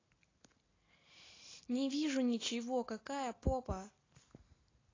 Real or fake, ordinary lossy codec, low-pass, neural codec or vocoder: real; AAC, 32 kbps; 7.2 kHz; none